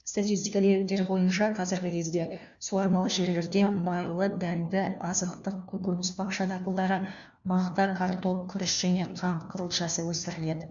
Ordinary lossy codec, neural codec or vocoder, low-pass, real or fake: none; codec, 16 kHz, 1 kbps, FunCodec, trained on LibriTTS, 50 frames a second; 7.2 kHz; fake